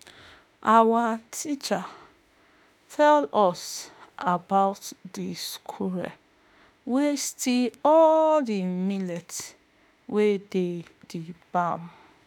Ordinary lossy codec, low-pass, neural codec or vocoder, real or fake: none; none; autoencoder, 48 kHz, 32 numbers a frame, DAC-VAE, trained on Japanese speech; fake